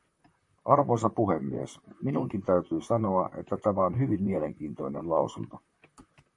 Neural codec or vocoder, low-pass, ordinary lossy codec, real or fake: vocoder, 44.1 kHz, 128 mel bands, Pupu-Vocoder; 10.8 kHz; MP3, 48 kbps; fake